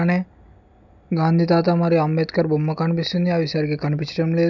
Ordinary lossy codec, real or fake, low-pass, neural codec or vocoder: none; real; 7.2 kHz; none